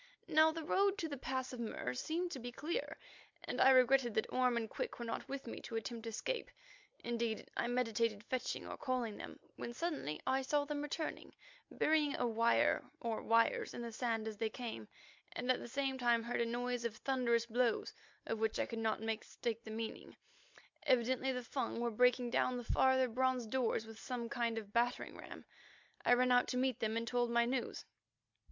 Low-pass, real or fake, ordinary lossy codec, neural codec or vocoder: 7.2 kHz; real; MP3, 64 kbps; none